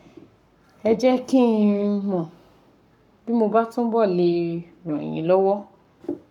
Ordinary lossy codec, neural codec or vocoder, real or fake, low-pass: none; codec, 44.1 kHz, 7.8 kbps, Pupu-Codec; fake; 19.8 kHz